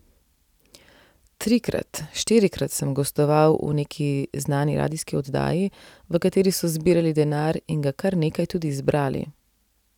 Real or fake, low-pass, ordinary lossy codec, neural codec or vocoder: real; 19.8 kHz; none; none